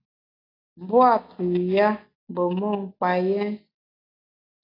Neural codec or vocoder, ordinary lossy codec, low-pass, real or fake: none; AAC, 32 kbps; 5.4 kHz; real